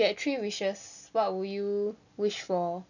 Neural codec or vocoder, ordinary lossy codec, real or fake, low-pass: none; none; real; 7.2 kHz